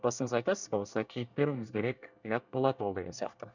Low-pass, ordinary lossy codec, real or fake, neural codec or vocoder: 7.2 kHz; none; fake; codec, 24 kHz, 1 kbps, SNAC